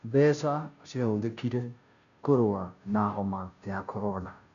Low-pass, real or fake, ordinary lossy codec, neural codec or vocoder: 7.2 kHz; fake; none; codec, 16 kHz, 0.5 kbps, FunCodec, trained on Chinese and English, 25 frames a second